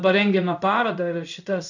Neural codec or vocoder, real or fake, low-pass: codec, 16 kHz in and 24 kHz out, 1 kbps, XY-Tokenizer; fake; 7.2 kHz